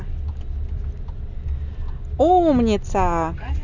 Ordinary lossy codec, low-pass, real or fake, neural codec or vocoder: none; 7.2 kHz; real; none